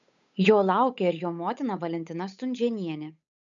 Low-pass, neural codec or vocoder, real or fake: 7.2 kHz; codec, 16 kHz, 8 kbps, FunCodec, trained on Chinese and English, 25 frames a second; fake